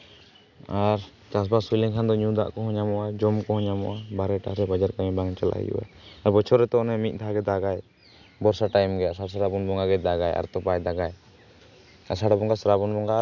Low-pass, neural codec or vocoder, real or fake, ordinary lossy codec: 7.2 kHz; none; real; none